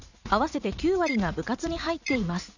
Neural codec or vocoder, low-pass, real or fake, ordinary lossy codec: vocoder, 44.1 kHz, 80 mel bands, Vocos; 7.2 kHz; fake; none